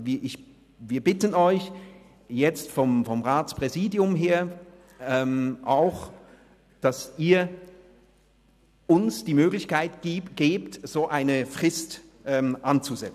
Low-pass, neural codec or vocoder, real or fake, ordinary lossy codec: 14.4 kHz; none; real; none